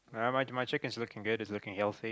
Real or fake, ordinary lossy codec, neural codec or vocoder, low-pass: real; none; none; none